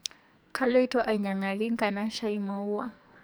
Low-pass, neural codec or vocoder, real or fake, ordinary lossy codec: none; codec, 44.1 kHz, 2.6 kbps, SNAC; fake; none